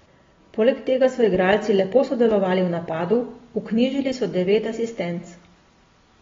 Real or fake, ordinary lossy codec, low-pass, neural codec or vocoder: real; AAC, 24 kbps; 7.2 kHz; none